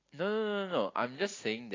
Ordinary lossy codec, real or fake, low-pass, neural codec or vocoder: AAC, 32 kbps; real; 7.2 kHz; none